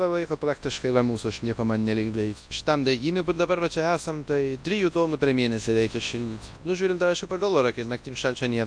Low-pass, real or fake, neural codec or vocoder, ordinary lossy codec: 9.9 kHz; fake; codec, 24 kHz, 0.9 kbps, WavTokenizer, large speech release; AAC, 64 kbps